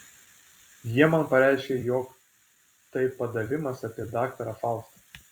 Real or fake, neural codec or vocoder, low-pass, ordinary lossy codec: fake; vocoder, 44.1 kHz, 128 mel bands every 256 samples, BigVGAN v2; 19.8 kHz; Opus, 64 kbps